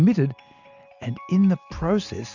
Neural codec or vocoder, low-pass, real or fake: none; 7.2 kHz; real